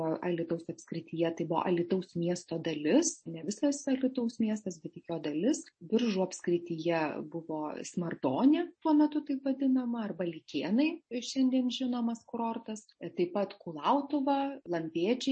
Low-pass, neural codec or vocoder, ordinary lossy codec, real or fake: 9.9 kHz; none; MP3, 32 kbps; real